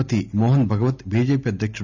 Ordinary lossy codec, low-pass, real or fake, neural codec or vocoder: none; 7.2 kHz; real; none